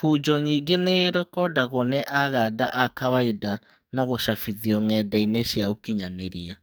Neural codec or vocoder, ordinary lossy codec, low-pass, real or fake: codec, 44.1 kHz, 2.6 kbps, SNAC; none; none; fake